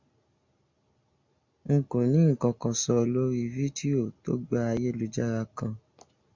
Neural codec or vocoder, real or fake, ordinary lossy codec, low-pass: none; real; MP3, 64 kbps; 7.2 kHz